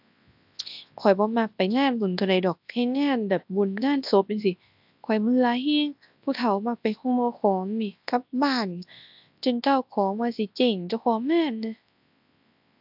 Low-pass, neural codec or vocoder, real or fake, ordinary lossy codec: 5.4 kHz; codec, 24 kHz, 0.9 kbps, WavTokenizer, large speech release; fake; AAC, 48 kbps